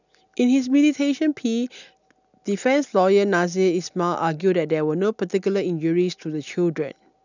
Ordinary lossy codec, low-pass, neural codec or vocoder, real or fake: none; 7.2 kHz; none; real